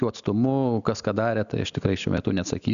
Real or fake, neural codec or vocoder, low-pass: real; none; 7.2 kHz